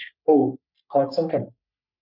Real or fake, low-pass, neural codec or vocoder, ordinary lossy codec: fake; 5.4 kHz; codec, 44.1 kHz, 3.4 kbps, Pupu-Codec; none